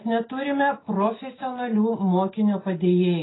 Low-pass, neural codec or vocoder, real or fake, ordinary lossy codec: 7.2 kHz; none; real; AAC, 16 kbps